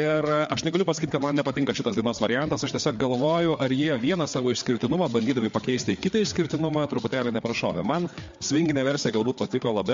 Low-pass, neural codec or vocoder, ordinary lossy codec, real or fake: 7.2 kHz; codec, 16 kHz, 4 kbps, FreqCodec, larger model; MP3, 48 kbps; fake